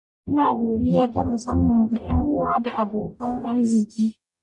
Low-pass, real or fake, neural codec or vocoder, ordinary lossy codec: 10.8 kHz; fake; codec, 44.1 kHz, 0.9 kbps, DAC; none